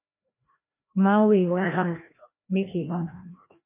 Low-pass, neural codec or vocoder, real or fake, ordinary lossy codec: 3.6 kHz; codec, 16 kHz, 1 kbps, FreqCodec, larger model; fake; MP3, 24 kbps